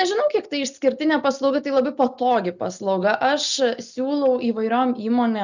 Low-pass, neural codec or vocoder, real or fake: 7.2 kHz; none; real